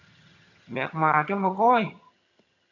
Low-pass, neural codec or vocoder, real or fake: 7.2 kHz; vocoder, 22.05 kHz, 80 mel bands, HiFi-GAN; fake